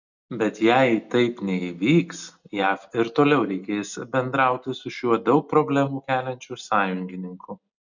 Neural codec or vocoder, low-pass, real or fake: none; 7.2 kHz; real